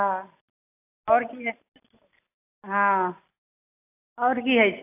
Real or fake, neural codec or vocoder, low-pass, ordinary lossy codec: real; none; 3.6 kHz; AAC, 24 kbps